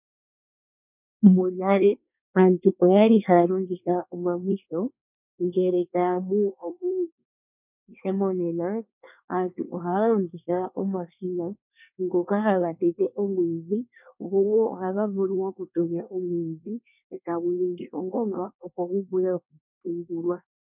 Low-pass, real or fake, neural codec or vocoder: 3.6 kHz; fake; codec, 24 kHz, 1 kbps, SNAC